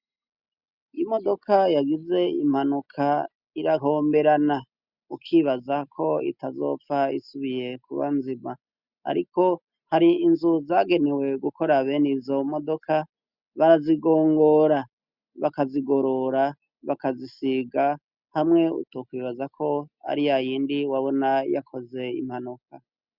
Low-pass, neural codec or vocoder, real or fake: 5.4 kHz; none; real